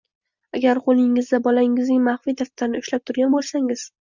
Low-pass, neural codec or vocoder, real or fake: 7.2 kHz; none; real